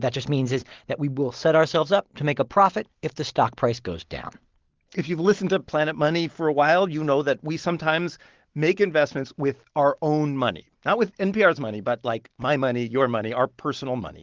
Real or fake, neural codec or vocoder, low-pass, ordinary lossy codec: real; none; 7.2 kHz; Opus, 16 kbps